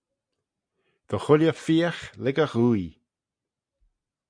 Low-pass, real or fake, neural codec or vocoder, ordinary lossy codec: 9.9 kHz; real; none; MP3, 64 kbps